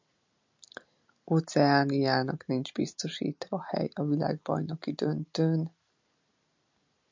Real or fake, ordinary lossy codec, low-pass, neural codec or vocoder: real; MP3, 64 kbps; 7.2 kHz; none